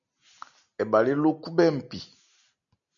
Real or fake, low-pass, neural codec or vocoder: real; 7.2 kHz; none